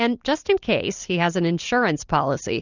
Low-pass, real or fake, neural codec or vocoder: 7.2 kHz; real; none